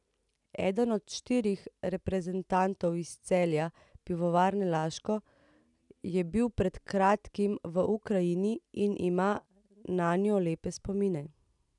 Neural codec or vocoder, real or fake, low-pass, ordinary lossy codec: none; real; 10.8 kHz; none